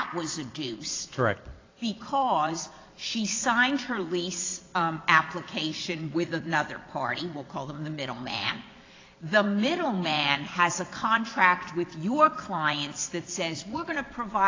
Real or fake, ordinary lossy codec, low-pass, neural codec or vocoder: fake; AAC, 32 kbps; 7.2 kHz; vocoder, 22.05 kHz, 80 mel bands, Vocos